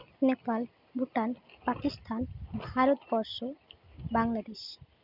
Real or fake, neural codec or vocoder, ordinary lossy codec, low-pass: real; none; none; 5.4 kHz